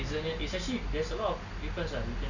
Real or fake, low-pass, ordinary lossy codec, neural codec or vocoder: real; 7.2 kHz; none; none